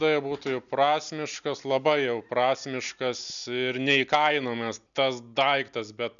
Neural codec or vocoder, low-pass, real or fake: none; 7.2 kHz; real